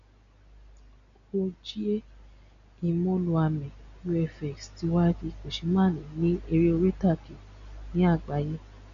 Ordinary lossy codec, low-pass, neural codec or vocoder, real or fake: none; 7.2 kHz; none; real